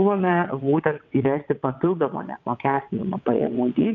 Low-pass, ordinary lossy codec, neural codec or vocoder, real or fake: 7.2 kHz; MP3, 64 kbps; vocoder, 22.05 kHz, 80 mel bands, WaveNeXt; fake